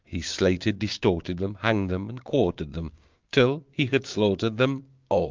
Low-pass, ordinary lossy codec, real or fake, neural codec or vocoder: 7.2 kHz; Opus, 32 kbps; fake; codec, 16 kHz, 6 kbps, DAC